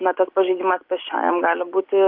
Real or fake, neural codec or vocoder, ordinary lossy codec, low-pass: real; none; Opus, 24 kbps; 5.4 kHz